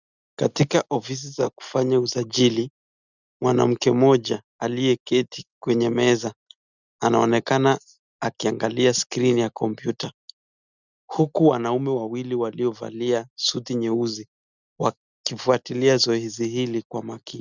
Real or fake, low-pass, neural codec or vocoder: real; 7.2 kHz; none